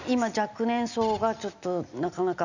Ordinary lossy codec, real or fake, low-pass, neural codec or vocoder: none; real; 7.2 kHz; none